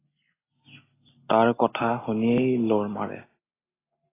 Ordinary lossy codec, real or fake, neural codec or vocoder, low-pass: AAC, 16 kbps; real; none; 3.6 kHz